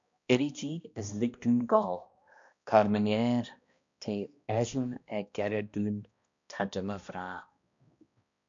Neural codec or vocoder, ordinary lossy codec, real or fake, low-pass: codec, 16 kHz, 1 kbps, X-Codec, HuBERT features, trained on balanced general audio; MP3, 64 kbps; fake; 7.2 kHz